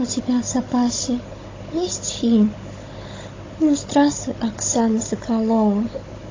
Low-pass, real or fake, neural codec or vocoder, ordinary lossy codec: 7.2 kHz; fake; codec, 16 kHz, 16 kbps, FunCodec, trained on Chinese and English, 50 frames a second; AAC, 32 kbps